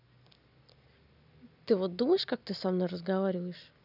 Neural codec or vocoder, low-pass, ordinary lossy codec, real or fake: none; 5.4 kHz; none; real